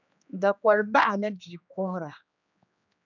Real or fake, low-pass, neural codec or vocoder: fake; 7.2 kHz; codec, 16 kHz, 2 kbps, X-Codec, HuBERT features, trained on general audio